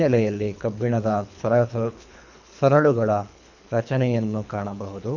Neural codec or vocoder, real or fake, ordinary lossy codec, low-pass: codec, 24 kHz, 3 kbps, HILCodec; fake; none; 7.2 kHz